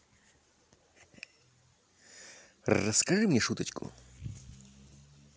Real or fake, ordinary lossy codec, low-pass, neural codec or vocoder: real; none; none; none